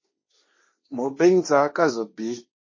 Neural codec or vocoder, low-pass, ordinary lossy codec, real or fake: codec, 16 kHz, 1.1 kbps, Voila-Tokenizer; 7.2 kHz; MP3, 32 kbps; fake